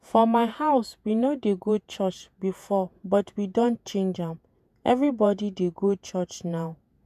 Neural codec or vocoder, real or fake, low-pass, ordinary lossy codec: vocoder, 48 kHz, 128 mel bands, Vocos; fake; 14.4 kHz; none